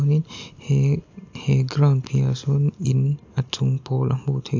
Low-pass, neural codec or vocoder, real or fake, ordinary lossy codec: 7.2 kHz; none; real; AAC, 48 kbps